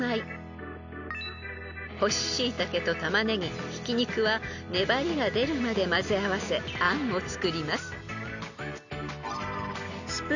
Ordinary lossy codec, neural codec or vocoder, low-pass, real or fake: none; none; 7.2 kHz; real